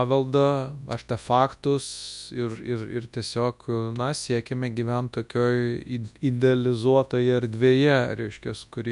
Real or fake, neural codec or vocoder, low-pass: fake; codec, 24 kHz, 0.9 kbps, WavTokenizer, large speech release; 10.8 kHz